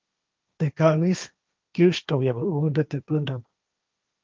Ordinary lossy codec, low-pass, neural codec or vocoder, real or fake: Opus, 24 kbps; 7.2 kHz; codec, 16 kHz, 1.1 kbps, Voila-Tokenizer; fake